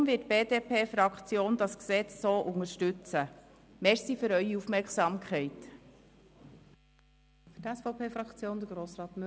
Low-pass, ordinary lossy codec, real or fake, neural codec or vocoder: none; none; real; none